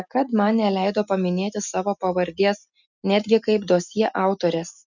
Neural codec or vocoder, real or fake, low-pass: none; real; 7.2 kHz